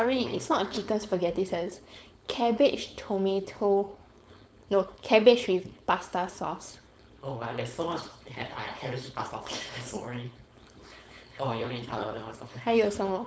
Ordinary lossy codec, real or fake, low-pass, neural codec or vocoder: none; fake; none; codec, 16 kHz, 4.8 kbps, FACodec